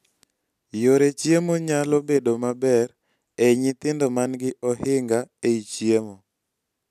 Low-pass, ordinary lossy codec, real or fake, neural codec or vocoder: 14.4 kHz; none; real; none